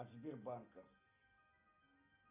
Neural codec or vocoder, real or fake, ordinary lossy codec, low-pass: none; real; MP3, 24 kbps; 3.6 kHz